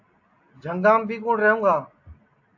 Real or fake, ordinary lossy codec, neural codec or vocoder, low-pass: real; AAC, 48 kbps; none; 7.2 kHz